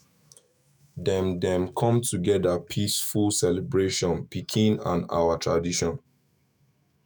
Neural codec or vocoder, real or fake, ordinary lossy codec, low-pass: autoencoder, 48 kHz, 128 numbers a frame, DAC-VAE, trained on Japanese speech; fake; none; none